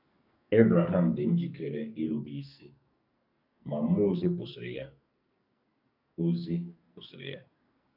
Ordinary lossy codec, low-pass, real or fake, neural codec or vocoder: none; 5.4 kHz; fake; codec, 44.1 kHz, 2.6 kbps, SNAC